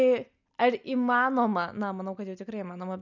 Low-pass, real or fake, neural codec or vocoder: 7.2 kHz; real; none